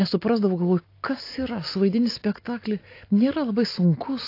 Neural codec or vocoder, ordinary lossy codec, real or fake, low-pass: none; AAC, 32 kbps; real; 5.4 kHz